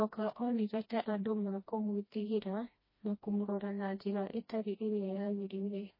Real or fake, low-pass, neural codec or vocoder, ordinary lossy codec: fake; 5.4 kHz; codec, 16 kHz, 1 kbps, FreqCodec, smaller model; MP3, 24 kbps